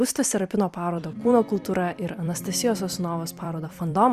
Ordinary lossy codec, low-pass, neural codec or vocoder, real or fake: Opus, 64 kbps; 14.4 kHz; vocoder, 44.1 kHz, 128 mel bands every 256 samples, BigVGAN v2; fake